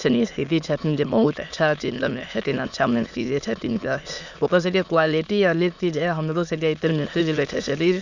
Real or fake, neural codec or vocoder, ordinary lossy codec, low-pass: fake; autoencoder, 22.05 kHz, a latent of 192 numbers a frame, VITS, trained on many speakers; none; 7.2 kHz